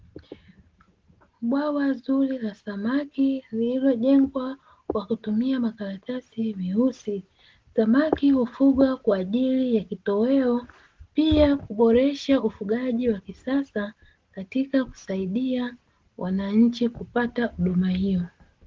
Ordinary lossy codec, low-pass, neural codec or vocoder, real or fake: Opus, 16 kbps; 7.2 kHz; none; real